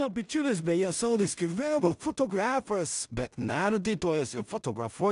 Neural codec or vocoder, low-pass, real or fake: codec, 16 kHz in and 24 kHz out, 0.4 kbps, LongCat-Audio-Codec, two codebook decoder; 10.8 kHz; fake